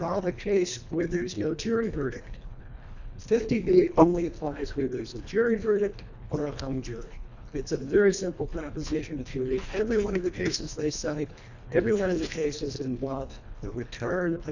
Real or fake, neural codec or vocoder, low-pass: fake; codec, 24 kHz, 1.5 kbps, HILCodec; 7.2 kHz